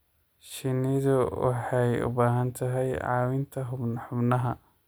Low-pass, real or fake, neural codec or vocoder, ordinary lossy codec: none; real; none; none